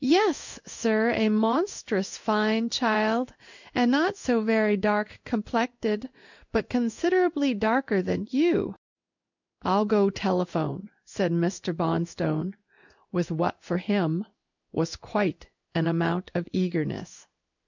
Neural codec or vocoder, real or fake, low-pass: codec, 16 kHz in and 24 kHz out, 1 kbps, XY-Tokenizer; fake; 7.2 kHz